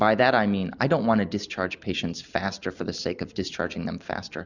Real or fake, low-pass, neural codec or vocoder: real; 7.2 kHz; none